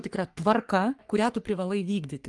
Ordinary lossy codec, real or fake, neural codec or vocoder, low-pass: Opus, 24 kbps; fake; codec, 44.1 kHz, 3.4 kbps, Pupu-Codec; 10.8 kHz